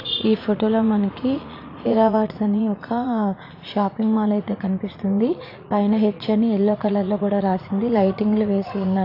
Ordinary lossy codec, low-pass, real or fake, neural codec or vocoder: AAC, 32 kbps; 5.4 kHz; fake; vocoder, 44.1 kHz, 80 mel bands, Vocos